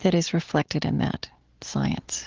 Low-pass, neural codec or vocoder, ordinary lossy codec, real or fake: 7.2 kHz; none; Opus, 16 kbps; real